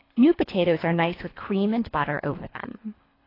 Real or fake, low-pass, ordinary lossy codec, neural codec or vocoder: fake; 5.4 kHz; AAC, 24 kbps; codec, 24 kHz, 3 kbps, HILCodec